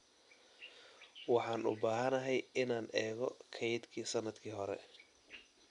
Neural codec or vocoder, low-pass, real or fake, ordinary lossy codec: none; 10.8 kHz; real; none